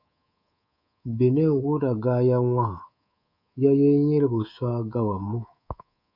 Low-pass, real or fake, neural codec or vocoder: 5.4 kHz; fake; codec, 24 kHz, 3.1 kbps, DualCodec